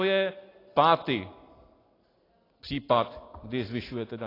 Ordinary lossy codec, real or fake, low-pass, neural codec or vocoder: AAC, 24 kbps; real; 5.4 kHz; none